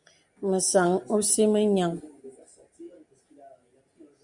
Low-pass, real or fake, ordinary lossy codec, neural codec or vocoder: 10.8 kHz; real; Opus, 64 kbps; none